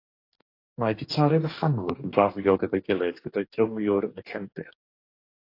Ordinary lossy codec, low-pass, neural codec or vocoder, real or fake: AAC, 32 kbps; 5.4 kHz; codec, 44.1 kHz, 2.6 kbps, DAC; fake